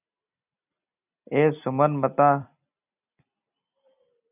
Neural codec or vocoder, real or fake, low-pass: vocoder, 44.1 kHz, 128 mel bands every 256 samples, BigVGAN v2; fake; 3.6 kHz